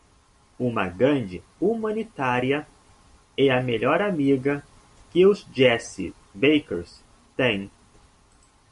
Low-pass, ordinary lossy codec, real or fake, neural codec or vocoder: 14.4 kHz; MP3, 48 kbps; real; none